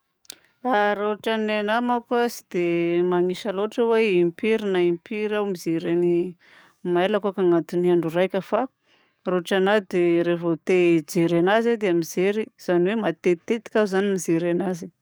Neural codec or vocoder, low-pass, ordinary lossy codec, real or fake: codec, 44.1 kHz, 7.8 kbps, DAC; none; none; fake